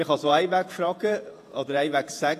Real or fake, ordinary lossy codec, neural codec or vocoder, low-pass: fake; AAC, 64 kbps; vocoder, 48 kHz, 128 mel bands, Vocos; 14.4 kHz